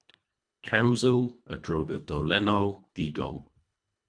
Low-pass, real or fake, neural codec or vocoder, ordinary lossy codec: 9.9 kHz; fake; codec, 24 kHz, 1.5 kbps, HILCodec; MP3, 96 kbps